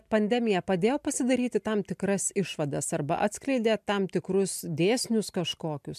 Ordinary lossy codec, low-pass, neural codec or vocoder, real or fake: MP3, 96 kbps; 14.4 kHz; none; real